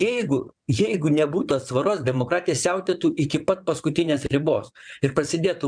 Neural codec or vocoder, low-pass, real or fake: vocoder, 22.05 kHz, 80 mel bands, WaveNeXt; 9.9 kHz; fake